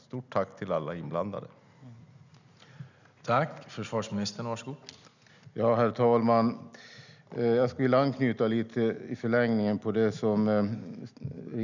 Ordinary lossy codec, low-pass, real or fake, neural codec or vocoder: none; 7.2 kHz; real; none